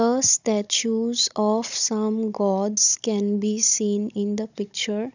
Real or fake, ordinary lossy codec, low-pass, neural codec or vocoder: fake; none; 7.2 kHz; codec, 16 kHz, 16 kbps, FunCodec, trained on Chinese and English, 50 frames a second